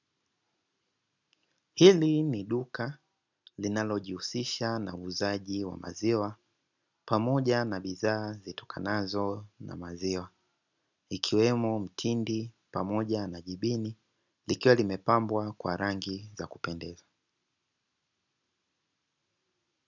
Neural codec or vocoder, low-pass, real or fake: none; 7.2 kHz; real